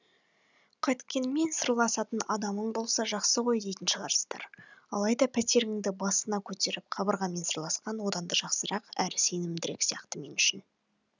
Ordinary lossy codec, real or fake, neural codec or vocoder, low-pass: none; real; none; 7.2 kHz